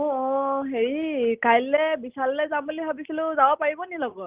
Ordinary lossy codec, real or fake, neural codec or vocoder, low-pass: Opus, 32 kbps; real; none; 3.6 kHz